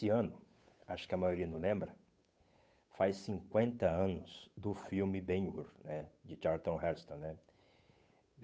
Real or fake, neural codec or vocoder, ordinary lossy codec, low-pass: fake; codec, 16 kHz, 8 kbps, FunCodec, trained on Chinese and English, 25 frames a second; none; none